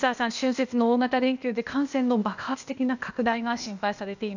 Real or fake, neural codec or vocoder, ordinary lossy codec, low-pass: fake; codec, 16 kHz, 0.8 kbps, ZipCodec; none; 7.2 kHz